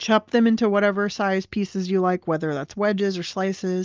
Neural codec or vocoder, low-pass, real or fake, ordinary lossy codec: none; 7.2 kHz; real; Opus, 24 kbps